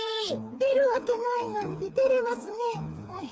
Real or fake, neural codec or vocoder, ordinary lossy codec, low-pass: fake; codec, 16 kHz, 4 kbps, FreqCodec, smaller model; none; none